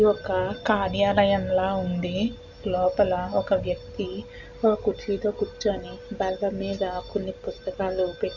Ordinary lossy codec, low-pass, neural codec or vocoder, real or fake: none; 7.2 kHz; none; real